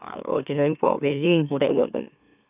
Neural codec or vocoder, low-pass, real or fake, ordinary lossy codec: autoencoder, 44.1 kHz, a latent of 192 numbers a frame, MeloTTS; 3.6 kHz; fake; none